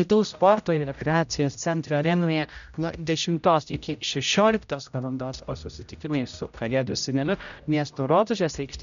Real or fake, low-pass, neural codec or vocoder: fake; 7.2 kHz; codec, 16 kHz, 0.5 kbps, X-Codec, HuBERT features, trained on general audio